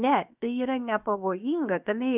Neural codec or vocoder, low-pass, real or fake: codec, 16 kHz, 0.7 kbps, FocalCodec; 3.6 kHz; fake